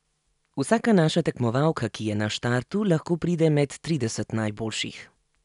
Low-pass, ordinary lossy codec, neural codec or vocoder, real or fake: 10.8 kHz; none; none; real